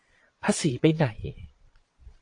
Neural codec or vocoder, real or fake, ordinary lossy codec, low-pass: vocoder, 22.05 kHz, 80 mel bands, Vocos; fake; AAC, 48 kbps; 9.9 kHz